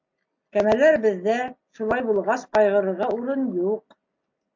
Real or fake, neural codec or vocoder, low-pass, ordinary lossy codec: real; none; 7.2 kHz; MP3, 48 kbps